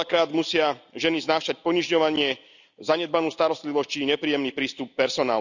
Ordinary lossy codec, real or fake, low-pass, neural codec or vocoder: none; real; 7.2 kHz; none